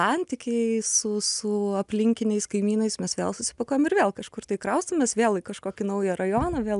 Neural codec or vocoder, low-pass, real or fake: none; 10.8 kHz; real